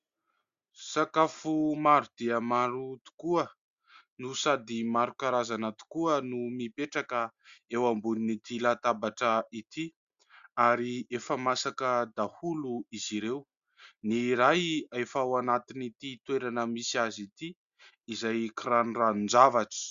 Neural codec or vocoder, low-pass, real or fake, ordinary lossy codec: none; 7.2 kHz; real; Opus, 64 kbps